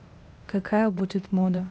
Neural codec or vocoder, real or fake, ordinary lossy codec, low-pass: codec, 16 kHz, 0.8 kbps, ZipCodec; fake; none; none